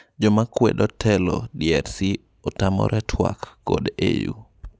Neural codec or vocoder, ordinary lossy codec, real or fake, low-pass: none; none; real; none